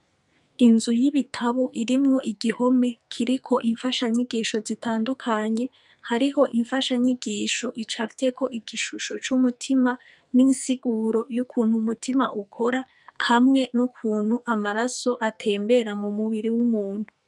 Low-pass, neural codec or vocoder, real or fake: 10.8 kHz; codec, 44.1 kHz, 2.6 kbps, SNAC; fake